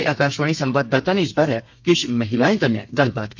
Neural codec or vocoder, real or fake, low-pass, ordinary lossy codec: codec, 32 kHz, 1.9 kbps, SNAC; fake; 7.2 kHz; MP3, 64 kbps